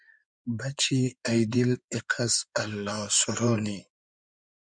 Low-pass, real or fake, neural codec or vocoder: 9.9 kHz; fake; codec, 16 kHz in and 24 kHz out, 2.2 kbps, FireRedTTS-2 codec